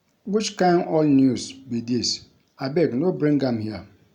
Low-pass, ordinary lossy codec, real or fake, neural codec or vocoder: 19.8 kHz; none; real; none